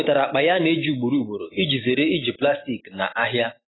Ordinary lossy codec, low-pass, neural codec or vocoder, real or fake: AAC, 16 kbps; 7.2 kHz; none; real